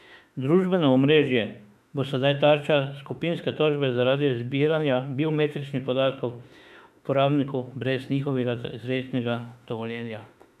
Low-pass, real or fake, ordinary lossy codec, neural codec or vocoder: 14.4 kHz; fake; none; autoencoder, 48 kHz, 32 numbers a frame, DAC-VAE, trained on Japanese speech